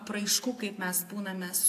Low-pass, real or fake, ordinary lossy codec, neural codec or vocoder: 14.4 kHz; real; AAC, 64 kbps; none